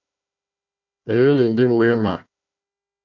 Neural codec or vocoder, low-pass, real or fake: codec, 16 kHz, 1 kbps, FunCodec, trained on Chinese and English, 50 frames a second; 7.2 kHz; fake